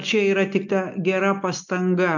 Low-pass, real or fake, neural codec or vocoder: 7.2 kHz; real; none